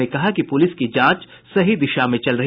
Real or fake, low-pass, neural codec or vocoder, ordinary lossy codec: real; 5.4 kHz; none; none